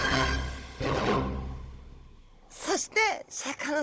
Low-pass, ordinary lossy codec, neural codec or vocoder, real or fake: none; none; codec, 16 kHz, 16 kbps, FunCodec, trained on Chinese and English, 50 frames a second; fake